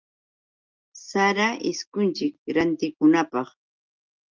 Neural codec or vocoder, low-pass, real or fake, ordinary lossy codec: none; 7.2 kHz; real; Opus, 32 kbps